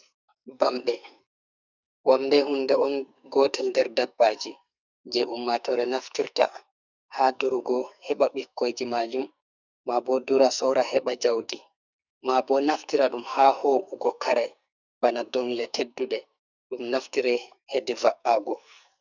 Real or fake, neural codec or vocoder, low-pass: fake; codec, 44.1 kHz, 2.6 kbps, SNAC; 7.2 kHz